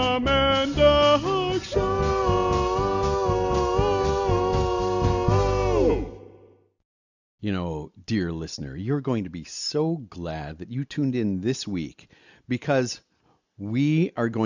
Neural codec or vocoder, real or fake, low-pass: none; real; 7.2 kHz